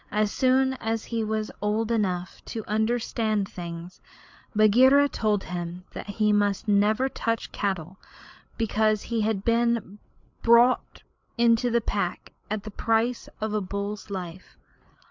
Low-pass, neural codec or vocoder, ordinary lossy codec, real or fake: 7.2 kHz; codec, 16 kHz, 8 kbps, FreqCodec, larger model; MP3, 64 kbps; fake